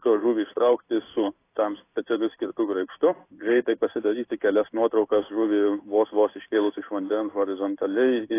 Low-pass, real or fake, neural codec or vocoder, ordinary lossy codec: 3.6 kHz; fake; codec, 16 kHz in and 24 kHz out, 1 kbps, XY-Tokenizer; AAC, 24 kbps